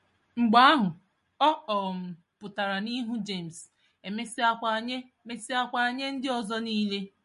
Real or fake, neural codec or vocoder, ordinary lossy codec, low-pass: real; none; MP3, 48 kbps; 10.8 kHz